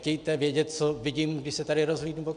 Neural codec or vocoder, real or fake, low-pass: none; real; 9.9 kHz